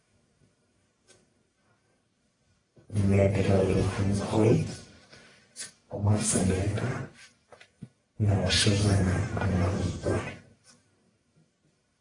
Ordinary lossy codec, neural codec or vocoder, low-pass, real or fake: AAC, 32 kbps; codec, 44.1 kHz, 1.7 kbps, Pupu-Codec; 10.8 kHz; fake